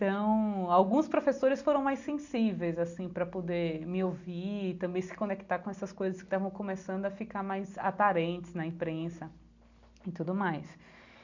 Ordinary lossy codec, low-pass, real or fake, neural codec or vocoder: none; 7.2 kHz; real; none